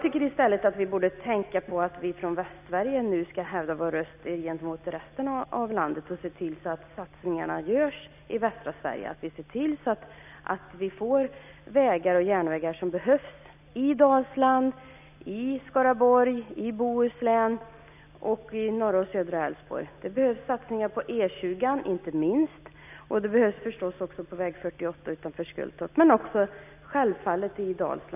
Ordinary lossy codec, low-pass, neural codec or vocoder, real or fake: none; 3.6 kHz; none; real